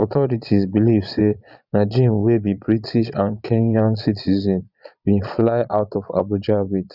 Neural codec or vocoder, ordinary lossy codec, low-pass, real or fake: vocoder, 22.05 kHz, 80 mel bands, Vocos; none; 5.4 kHz; fake